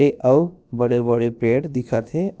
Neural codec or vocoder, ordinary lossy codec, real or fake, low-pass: codec, 16 kHz, about 1 kbps, DyCAST, with the encoder's durations; none; fake; none